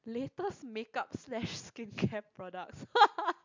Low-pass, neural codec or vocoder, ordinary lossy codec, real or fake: 7.2 kHz; none; MP3, 64 kbps; real